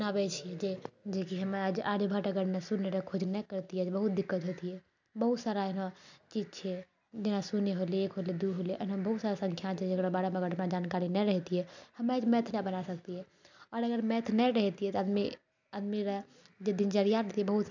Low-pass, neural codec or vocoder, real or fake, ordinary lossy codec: 7.2 kHz; none; real; none